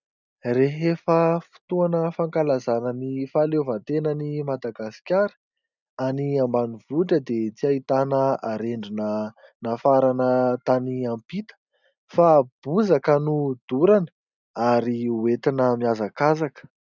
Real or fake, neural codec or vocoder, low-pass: real; none; 7.2 kHz